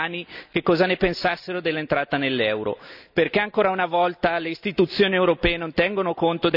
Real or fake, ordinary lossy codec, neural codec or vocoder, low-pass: real; none; none; 5.4 kHz